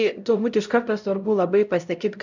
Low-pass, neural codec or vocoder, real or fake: 7.2 kHz; codec, 16 kHz, 0.5 kbps, X-Codec, HuBERT features, trained on LibriSpeech; fake